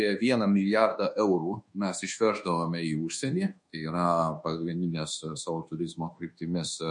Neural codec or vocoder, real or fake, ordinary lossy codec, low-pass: codec, 24 kHz, 1.2 kbps, DualCodec; fake; MP3, 48 kbps; 10.8 kHz